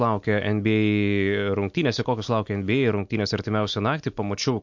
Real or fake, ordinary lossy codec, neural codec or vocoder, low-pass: real; MP3, 48 kbps; none; 7.2 kHz